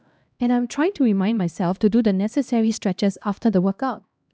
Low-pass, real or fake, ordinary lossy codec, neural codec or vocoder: none; fake; none; codec, 16 kHz, 1 kbps, X-Codec, HuBERT features, trained on LibriSpeech